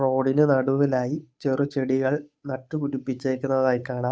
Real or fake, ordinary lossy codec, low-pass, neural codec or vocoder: fake; none; none; codec, 16 kHz, 8 kbps, FunCodec, trained on Chinese and English, 25 frames a second